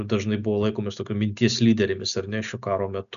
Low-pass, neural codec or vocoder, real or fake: 7.2 kHz; none; real